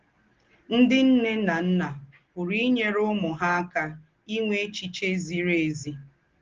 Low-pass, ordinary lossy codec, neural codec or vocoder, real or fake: 7.2 kHz; Opus, 16 kbps; none; real